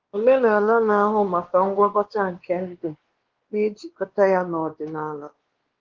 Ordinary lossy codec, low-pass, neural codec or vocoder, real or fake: Opus, 16 kbps; 7.2 kHz; codec, 16 kHz, 2 kbps, X-Codec, WavLM features, trained on Multilingual LibriSpeech; fake